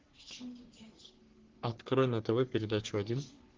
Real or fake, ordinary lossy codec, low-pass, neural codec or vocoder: fake; Opus, 16 kbps; 7.2 kHz; codec, 44.1 kHz, 3.4 kbps, Pupu-Codec